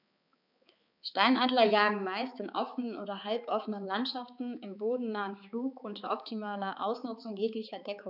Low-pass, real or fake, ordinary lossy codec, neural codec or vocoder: 5.4 kHz; fake; none; codec, 16 kHz, 4 kbps, X-Codec, HuBERT features, trained on balanced general audio